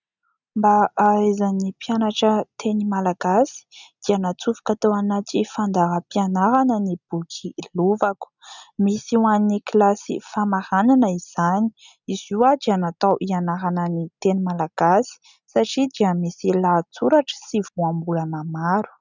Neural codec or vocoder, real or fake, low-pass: none; real; 7.2 kHz